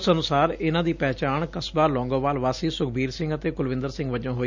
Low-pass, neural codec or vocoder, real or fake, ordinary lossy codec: 7.2 kHz; none; real; none